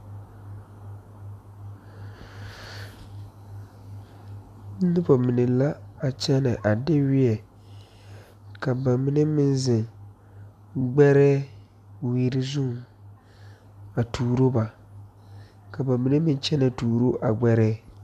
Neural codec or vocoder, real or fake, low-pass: none; real; 14.4 kHz